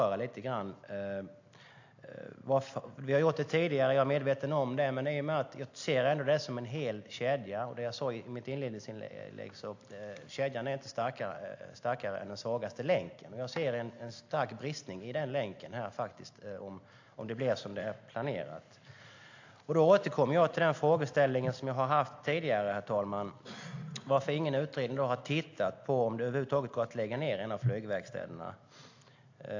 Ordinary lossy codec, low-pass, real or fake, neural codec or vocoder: AAC, 48 kbps; 7.2 kHz; real; none